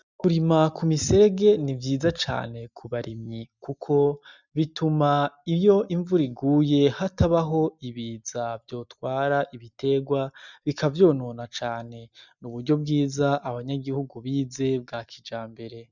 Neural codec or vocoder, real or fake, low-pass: none; real; 7.2 kHz